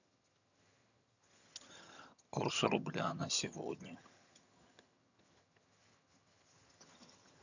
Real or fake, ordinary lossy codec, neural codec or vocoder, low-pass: fake; none; vocoder, 22.05 kHz, 80 mel bands, HiFi-GAN; 7.2 kHz